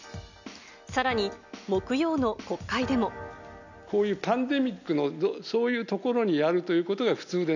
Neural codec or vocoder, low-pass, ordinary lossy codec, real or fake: none; 7.2 kHz; none; real